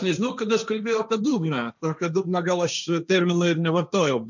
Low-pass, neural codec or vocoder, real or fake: 7.2 kHz; codec, 16 kHz, 1.1 kbps, Voila-Tokenizer; fake